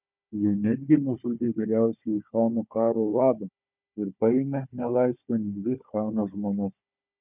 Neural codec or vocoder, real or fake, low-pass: codec, 16 kHz, 4 kbps, FunCodec, trained on Chinese and English, 50 frames a second; fake; 3.6 kHz